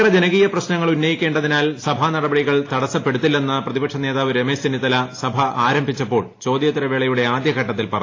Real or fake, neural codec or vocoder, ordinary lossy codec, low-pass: real; none; AAC, 32 kbps; 7.2 kHz